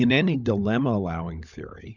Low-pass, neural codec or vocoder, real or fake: 7.2 kHz; codec, 16 kHz, 16 kbps, FunCodec, trained on LibriTTS, 50 frames a second; fake